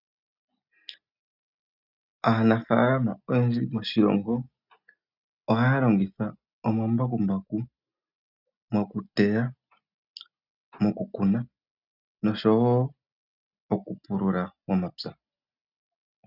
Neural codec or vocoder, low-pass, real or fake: none; 5.4 kHz; real